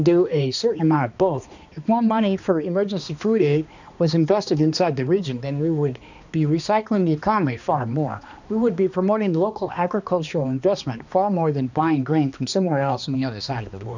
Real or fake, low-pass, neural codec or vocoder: fake; 7.2 kHz; codec, 16 kHz, 2 kbps, X-Codec, HuBERT features, trained on balanced general audio